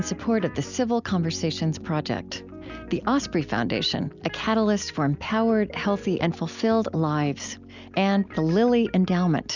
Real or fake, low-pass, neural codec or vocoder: real; 7.2 kHz; none